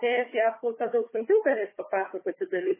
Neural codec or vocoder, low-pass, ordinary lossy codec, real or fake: codec, 16 kHz, 4 kbps, FunCodec, trained on Chinese and English, 50 frames a second; 3.6 kHz; MP3, 16 kbps; fake